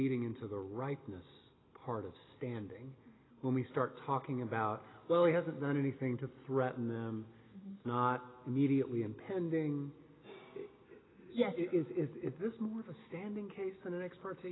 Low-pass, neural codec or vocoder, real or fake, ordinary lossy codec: 7.2 kHz; none; real; AAC, 16 kbps